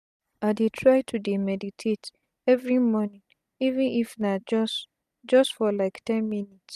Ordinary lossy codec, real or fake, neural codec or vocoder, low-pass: none; real; none; 14.4 kHz